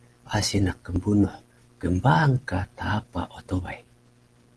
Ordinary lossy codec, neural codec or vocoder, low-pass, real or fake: Opus, 16 kbps; none; 10.8 kHz; real